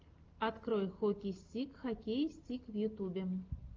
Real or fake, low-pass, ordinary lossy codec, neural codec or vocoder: real; 7.2 kHz; Opus, 24 kbps; none